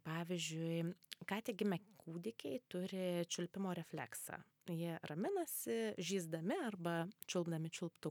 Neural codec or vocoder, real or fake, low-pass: none; real; 19.8 kHz